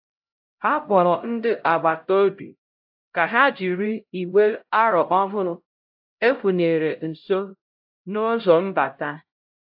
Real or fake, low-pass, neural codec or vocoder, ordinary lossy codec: fake; 5.4 kHz; codec, 16 kHz, 0.5 kbps, X-Codec, HuBERT features, trained on LibriSpeech; AAC, 48 kbps